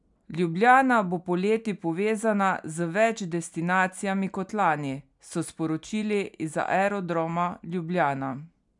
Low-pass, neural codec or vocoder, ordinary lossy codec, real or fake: 10.8 kHz; none; none; real